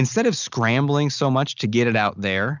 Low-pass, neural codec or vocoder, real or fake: 7.2 kHz; none; real